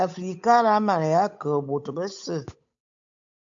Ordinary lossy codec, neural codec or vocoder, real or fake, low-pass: AAC, 64 kbps; codec, 16 kHz, 8 kbps, FunCodec, trained on Chinese and English, 25 frames a second; fake; 7.2 kHz